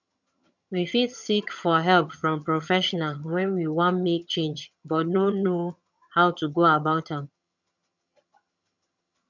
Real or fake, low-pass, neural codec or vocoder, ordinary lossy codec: fake; 7.2 kHz; vocoder, 22.05 kHz, 80 mel bands, HiFi-GAN; none